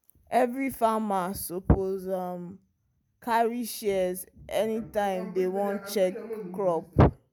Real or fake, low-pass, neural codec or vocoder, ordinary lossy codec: real; none; none; none